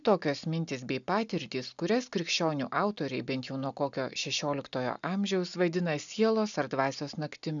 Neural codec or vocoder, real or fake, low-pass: none; real; 7.2 kHz